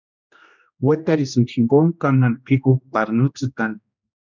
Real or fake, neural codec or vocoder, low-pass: fake; codec, 16 kHz, 1 kbps, X-Codec, HuBERT features, trained on general audio; 7.2 kHz